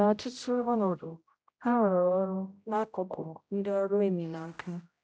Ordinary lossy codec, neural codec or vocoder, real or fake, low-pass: none; codec, 16 kHz, 0.5 kbps, X-Codec, HuBERT features, trained on general audio; fake; none